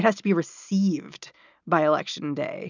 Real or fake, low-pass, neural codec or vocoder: real; 7.2 kHz; none